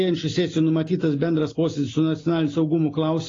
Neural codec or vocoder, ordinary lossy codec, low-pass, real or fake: none; AAC, 32 kbps; 7.2 kHz; real